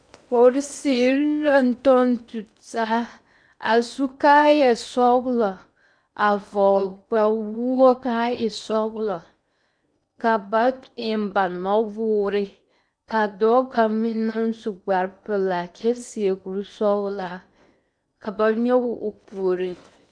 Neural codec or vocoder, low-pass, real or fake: codec, 16 kHz in and 24 kHz out, 0.8 kbps, FocalCodec, streaming, 65536 codes; 9.9 kHz; fake